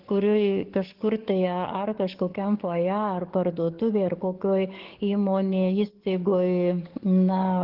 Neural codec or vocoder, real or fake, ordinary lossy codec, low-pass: codec, 44.1 kHz, 7.8 kbps, DAC; fake; Opus, 16 kbps; 5.4 kHz